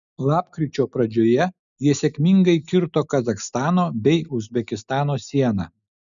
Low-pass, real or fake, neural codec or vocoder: 7.2 kHz; real; none